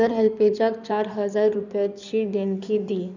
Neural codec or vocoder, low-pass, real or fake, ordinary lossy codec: codec, 16 kHz in and 24 kHz out, 2.2 kbps, FireRedTTS-2 codec; 7.2 kHz; fake; none